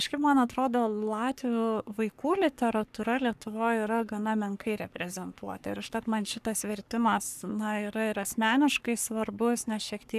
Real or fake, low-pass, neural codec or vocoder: fake; 14.4 kHz; codec, 44.1 kHz, 3.4 kbps, Pupu-Codec